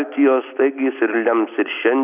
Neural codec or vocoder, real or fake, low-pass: none; real; 3.6 kHz